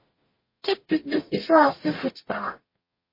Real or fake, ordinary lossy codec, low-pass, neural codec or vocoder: fake; MP3, 24 kbps; 5.4 kHz; codec, 44.1 kHz, 0.9 kbps, DAC